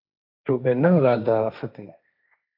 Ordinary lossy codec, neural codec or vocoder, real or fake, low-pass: AAC, 48 kbps; codec, 16 kHz, 1.1 kbps, Voila-Tokenizer; fake; 5.4 kHz